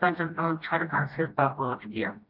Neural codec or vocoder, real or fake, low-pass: codec, 16 kHz, 1 kbps, FreqCodec, smaller model; fake; 5.4 kHz